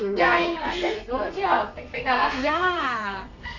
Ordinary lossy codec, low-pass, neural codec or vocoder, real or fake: none; 7.2 kHz; codec, 32 kHz, 1.9 kbps, SNAC; fake